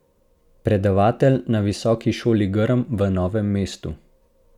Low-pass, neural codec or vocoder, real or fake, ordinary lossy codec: 19.8 kHz; none; real; none